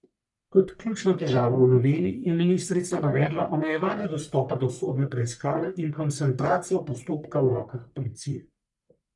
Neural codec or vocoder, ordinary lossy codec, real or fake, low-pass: codec, 44.1 kHz, 1.7 kbps, Pupu-Codec; none; fake; 10.8 kHz